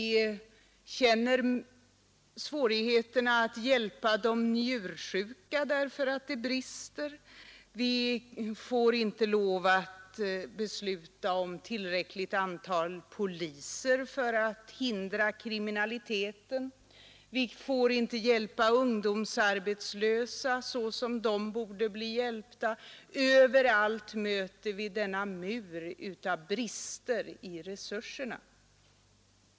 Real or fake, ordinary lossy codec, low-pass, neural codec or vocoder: real; none; none; none